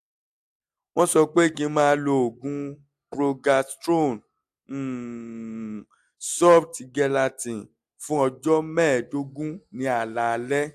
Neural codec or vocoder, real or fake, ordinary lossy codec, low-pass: none; real; AAC, 96 kbps; 14.4 kHz